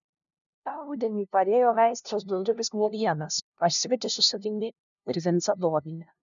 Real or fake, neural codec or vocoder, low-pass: fake; codec, 16 kHz, 0.5 kbps, FunCodec, trained on LibriTTS, 25 frames a second; 7.2 kHz